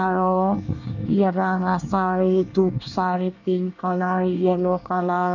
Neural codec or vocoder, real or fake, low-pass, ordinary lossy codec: codec, 24 kHz, 1 kbps, SNAC; fake; 7.2 kHz; none